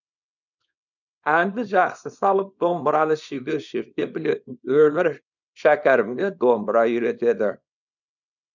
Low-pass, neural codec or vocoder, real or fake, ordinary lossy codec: 7.2 kHz; codec, 24 kHz, 0.9 kbps, WavTokenizer, small release; fake; none